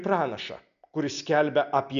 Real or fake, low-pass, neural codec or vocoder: real; 7.2 kHz; none